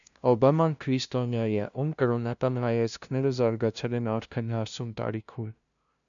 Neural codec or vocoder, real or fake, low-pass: codec, 16 kHz, 0.5 kbps, FunCodec, trained on LibriTTS, 25 frames a second; fake; 7.2 kHz